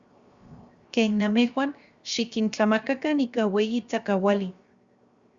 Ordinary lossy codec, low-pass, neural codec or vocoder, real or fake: Opus, 64 kbps; 7.2 kHz; codec, 16 kHz, 0.7 kbps, FocalCodec; fake